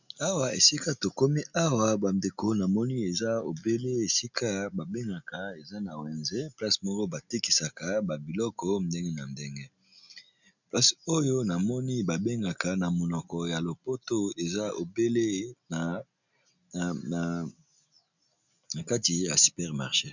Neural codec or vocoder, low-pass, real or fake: none; 7.2 kHz; real